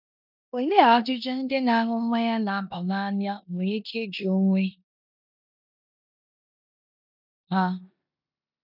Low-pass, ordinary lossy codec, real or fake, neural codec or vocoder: 5.4 kHz; none; fake; codec, 16 kHz in and 24 kHz out, 0.9 kbps, LongCat-Audio-Codec, four codebook decoder